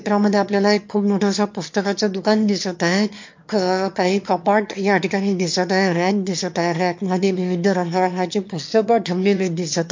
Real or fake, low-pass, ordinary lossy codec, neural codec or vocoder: fake; 7.2 kHz; MP3, 48 kbps; autoencoder, 22.05 kHz, a latent of 192 numbers a frame, VITS, trained on one speaker